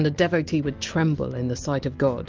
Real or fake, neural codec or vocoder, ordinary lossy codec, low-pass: real; none; Opus, 24 kbps; 7.2 kHz